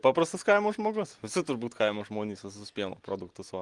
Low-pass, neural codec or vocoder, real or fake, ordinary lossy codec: 10.8 kHz; none; real; Opus, 24 kbps